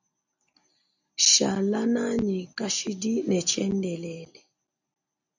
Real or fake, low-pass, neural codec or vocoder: real; 7.2 kHz; none